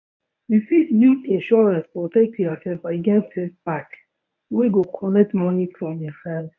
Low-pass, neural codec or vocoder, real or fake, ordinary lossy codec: 7.2 kHz; codec, 24 kHz, 0.9 kbps, WavTokenizer, medium speech release version 1; fake; none